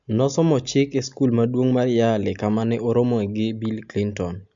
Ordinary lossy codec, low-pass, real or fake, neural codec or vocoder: MP3, 64 kbps; 7.2 kHz; real; none